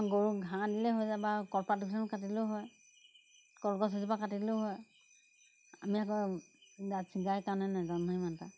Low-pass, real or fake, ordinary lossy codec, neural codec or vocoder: none; real; none; none